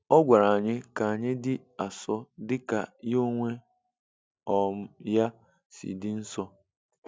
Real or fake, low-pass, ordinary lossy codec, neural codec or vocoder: real; none; none; none